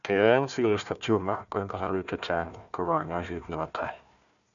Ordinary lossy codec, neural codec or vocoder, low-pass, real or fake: none; codec, 16 kHz, 1 kbps, FunCodec, trained on Chinese and English, 50 frames a second; 7.2 kHz; fake